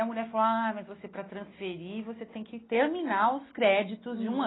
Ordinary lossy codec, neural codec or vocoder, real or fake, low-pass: AAC, 16 kbps; none; real; 7.2 kHz